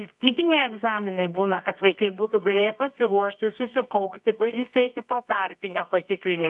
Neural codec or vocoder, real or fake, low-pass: codec, 24 kHz, 0.9 kbps, WavTokenizer, medium music audio release; fake; 10.8 kHz